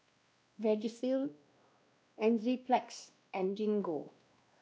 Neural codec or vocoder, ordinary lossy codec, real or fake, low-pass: codec, 16 kHz, 1 kbps, X-Codec, WavLM features, trained on Multilingual LibriSpeech; none; fake; none